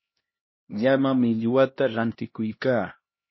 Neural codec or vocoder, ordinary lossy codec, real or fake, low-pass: codec, 16 kHz, 1 kbps, X-Codec, HuBERT features, trained on LibriSpeech; MP3, 24 kbps; fake; 7.2 kHz